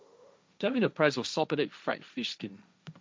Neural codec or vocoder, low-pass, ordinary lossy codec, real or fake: codec, 16 kHz, 1.1 kbps, Voila-Tokenizer; none; none; fake